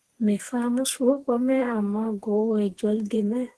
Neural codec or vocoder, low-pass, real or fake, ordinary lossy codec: codec, 32 kHz, 1.9 kbps, SNAC; 10.8 kHz; fake; Opus, 16 kbps